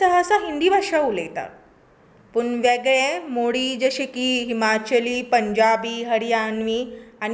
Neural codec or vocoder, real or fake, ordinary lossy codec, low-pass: none; real; none; none